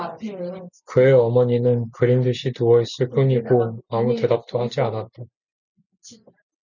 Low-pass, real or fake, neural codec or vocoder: 7.2 kHz; real; none